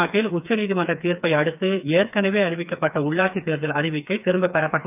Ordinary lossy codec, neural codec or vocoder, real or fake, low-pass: none; codec, 16 kHz, 4 kbps, FreqCodec, smaller model; fake; 3.6 kHz